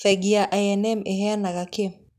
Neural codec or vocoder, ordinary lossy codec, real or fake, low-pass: none; none; real; 14.4 kHz